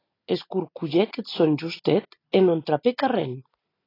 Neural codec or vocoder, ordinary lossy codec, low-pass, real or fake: none; AAC, 24 kbps; 5.4 kHz; real